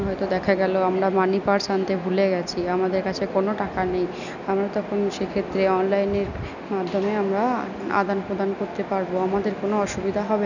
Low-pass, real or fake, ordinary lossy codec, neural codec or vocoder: 7.2 kHz; real; none; none